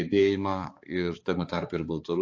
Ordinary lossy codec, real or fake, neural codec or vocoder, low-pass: AAC, 48 kbps; fake; codec, 16 kHz, 4 kbps, X-Codec, HuBERT features, trained on balanced general audio; 7.2 kHz